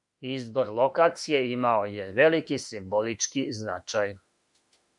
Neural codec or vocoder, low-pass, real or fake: autoencoder, 48 kHz, 32 numbers a frame, DAC-VAE, trained on Japanese speech; 10.8 kHz; fake